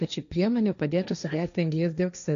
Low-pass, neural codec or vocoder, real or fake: 7.2 kHz; codec, 16 kHz, 1.1 kbps, Voila-Tokenizer; fake